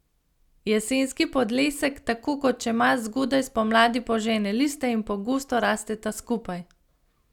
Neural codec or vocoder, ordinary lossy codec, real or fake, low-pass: none; none; real; 19.8 kHz